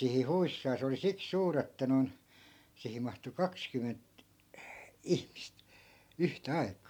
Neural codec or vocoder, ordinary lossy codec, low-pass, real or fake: none; MP3, 96 kbps; 19.8 kHz; real